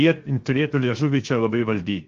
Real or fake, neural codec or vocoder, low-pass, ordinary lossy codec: fake; codec, 16 kHz, 0.7 kbps, FocalCodec; 7.2 kHz; Opus, 32 kbps